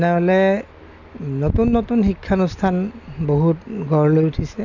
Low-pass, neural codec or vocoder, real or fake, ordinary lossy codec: 7.2 kHz; none; real; none